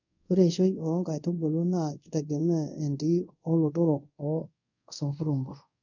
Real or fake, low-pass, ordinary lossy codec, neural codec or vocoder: fake; 7.2 kHz; none; codec, 24 kHz, 0.5 kbps, DualCodec